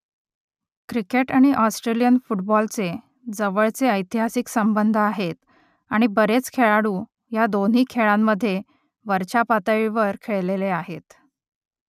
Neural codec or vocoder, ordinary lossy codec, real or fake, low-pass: none; none; real; 14.4 kHz